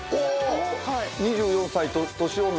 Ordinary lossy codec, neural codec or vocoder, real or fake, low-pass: none; none; real; none